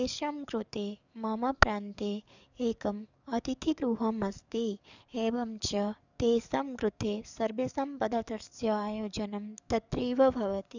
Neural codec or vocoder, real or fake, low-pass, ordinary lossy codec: codec, 44.1 kHz, 7.8 kbps, DAC; fake; 7.2 kHz; none